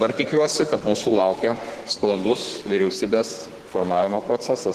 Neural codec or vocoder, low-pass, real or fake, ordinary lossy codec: codec, 32 kHz, 1.9 kbps, SNAC; 14.4 kHz; fake; Opus, 16 kbps